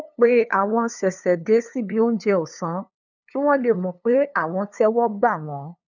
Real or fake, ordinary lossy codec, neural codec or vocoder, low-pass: fake; none; codec, 16 kHz, 2 kbps, FunCodec, trained on LibriTTS, 25 frames a second; 7.2 kHz